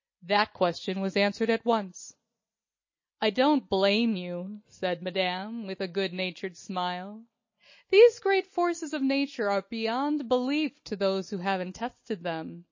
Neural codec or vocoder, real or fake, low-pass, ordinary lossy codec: none; real; 7.2 kHz; MP3, 32 kbps